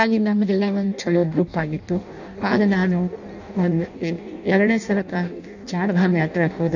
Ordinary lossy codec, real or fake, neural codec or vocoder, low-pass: none; fake; codec, 16 kHz in and 24 kHz out, 0.6 kbps, FireRedTTS-2 codec; 7.2 kHz